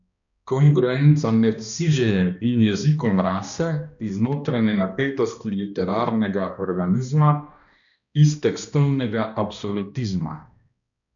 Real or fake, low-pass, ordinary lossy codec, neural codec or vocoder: fake; 7.2 kHz; MP3, 96 kbps; codec, 16 kHz, 1 kbps, X-Codec, HuBERT features, trained on balanced general audio